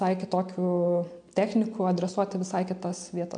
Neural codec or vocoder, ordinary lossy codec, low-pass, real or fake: none; MP3, 64 kbps; 9.9 kHz; real